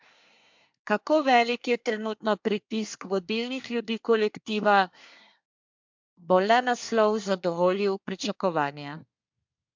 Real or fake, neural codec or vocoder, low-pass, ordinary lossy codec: fake; codec, 24 kHz, 1 kbps, SNAC; 7.2 kHz; MP3, 64 kbps